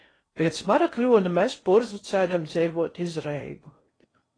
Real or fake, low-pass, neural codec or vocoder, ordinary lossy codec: fake; 9.9 kHz; codec, 16 kHz in and 24 kHz out, 0.6 kbps, FocalCodec, streaming, 4096 codes; AAC, 32 kbps